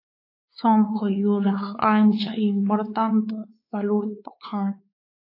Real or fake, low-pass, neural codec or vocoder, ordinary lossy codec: fake; 5.4 kHz; codec, 16 kHz, 4 kbps, X-Codec, WavLM features, trained on Multilingual LibriSpeech; AAC, 32 kbps